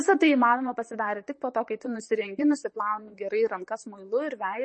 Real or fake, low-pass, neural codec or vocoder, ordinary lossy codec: fake; 10.8 kHz; vocoder, 44.1 kHz, 128 mel bands, Pupu-Vocoder; MP3, 32 kbps